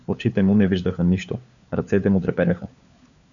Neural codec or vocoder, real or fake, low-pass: codec, 16 kHz, 4 kbps, FunCodec, trained on LibriTTS, 50 frames a second; fake; 7.2 kHz